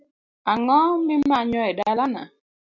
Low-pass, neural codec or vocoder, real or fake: 7.2 kHz; none; real